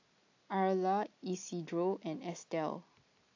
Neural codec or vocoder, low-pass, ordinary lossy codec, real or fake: none; 7.2 kHz; AAC, 48 kbps; real